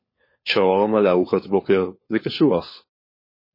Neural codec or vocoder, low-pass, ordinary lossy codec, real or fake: codec, 16 kHz, 4 kbps, FunCodec, trained on LibriTTS, 50 frames a second; 5.4 kHz; MP3, 24 kbps; fake